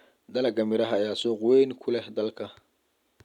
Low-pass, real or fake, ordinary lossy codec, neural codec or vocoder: 19.8 kHz; real; none; none